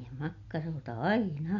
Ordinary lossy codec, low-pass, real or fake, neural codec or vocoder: none; 7.2 kHz; real; none